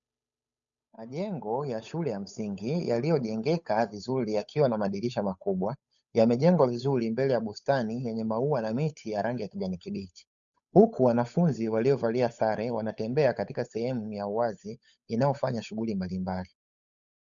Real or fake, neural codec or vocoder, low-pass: fake; codec, 16 kHz, 8 kbps, FunCodec, trained on Chinese and English, 25 frames a second; 7.2 kHz